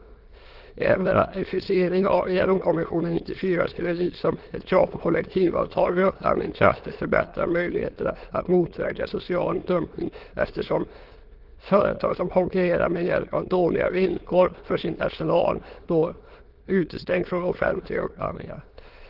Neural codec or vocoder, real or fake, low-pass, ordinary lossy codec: autoencoder, 22.05 kHz, a latent of 192 numbers a frame, VITS, trained on many speakers; fake; 5.4 kHz; Opus, 16 kbps